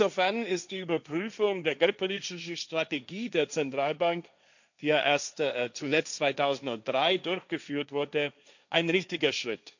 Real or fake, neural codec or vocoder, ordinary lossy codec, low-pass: fake; codec, 16 kHz, 1.1 kbps, Voila-Tokenizer; none; 7.2 kHz